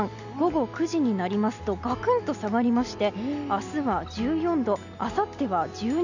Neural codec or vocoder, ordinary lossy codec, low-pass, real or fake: none; none; 7.2 kHz; real